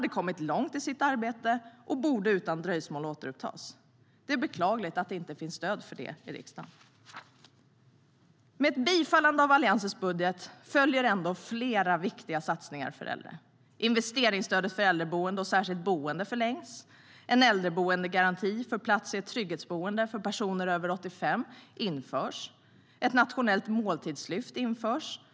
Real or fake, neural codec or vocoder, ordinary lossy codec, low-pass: real; none; none; none